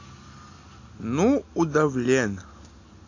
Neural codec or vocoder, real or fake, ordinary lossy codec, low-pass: none; real; AAC, 48 kbps; 7.2 kHz